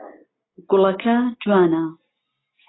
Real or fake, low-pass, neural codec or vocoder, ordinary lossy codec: real; 7.2 kHz; none; AAC, 16 kbps